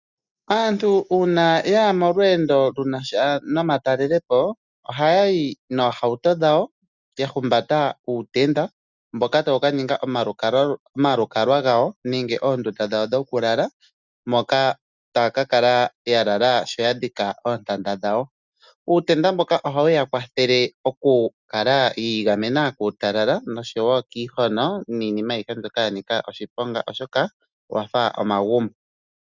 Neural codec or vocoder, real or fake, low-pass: none; real; 7.2 kHz